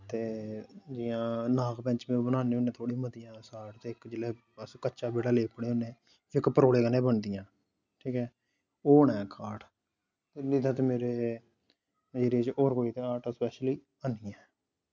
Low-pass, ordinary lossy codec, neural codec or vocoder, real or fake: 7.2 kHz; none; none; real